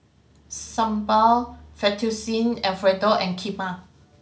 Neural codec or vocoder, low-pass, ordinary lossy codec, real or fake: none; none; none; real